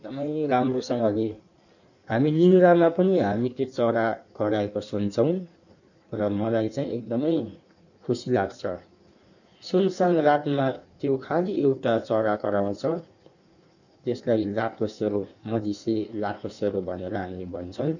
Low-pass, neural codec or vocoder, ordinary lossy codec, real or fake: 7.2 kHz; codec, 16 kHz in and 24 kHz out, 1.1 kbps, FireRedTTS-2 codec; none; fake